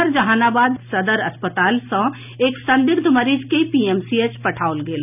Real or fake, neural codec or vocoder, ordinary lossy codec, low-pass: real; none; none; 3.6 kHz